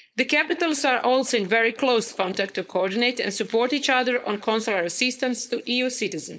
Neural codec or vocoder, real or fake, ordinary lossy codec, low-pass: codec, 16 kHz, 4.8 kbps, FACodec; fake; none; none